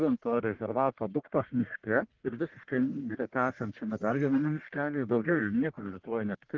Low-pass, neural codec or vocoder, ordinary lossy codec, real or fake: 7.2 kHz; codec, 44.1 kHz, 1.7 kbps, Pupu-Codec; Opus, 16 kbps; fake